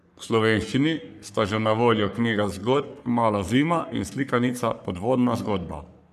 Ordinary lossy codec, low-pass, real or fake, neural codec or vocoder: none; 14.4 kHz; fake; codec, 44.1 kHz, 3.4 kbps, Pupu-Codec